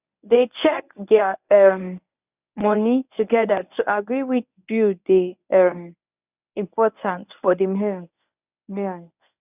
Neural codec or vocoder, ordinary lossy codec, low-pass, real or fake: codec, 24 kHz, 0.9 kbps, WavTokenizer, medium speech release version 1; none; 3.6 kHz; fake